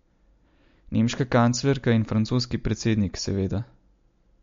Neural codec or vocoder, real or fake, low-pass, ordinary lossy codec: none; real; 7.2 kHz; MP3, 48 kbps